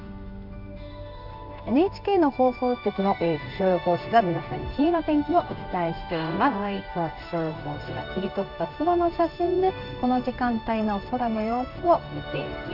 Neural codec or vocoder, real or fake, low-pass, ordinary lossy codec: codec, 16 kHz in and 24 kHz out, 1 kbps, XY-Tokenizer; fake; 5.4 kHz; none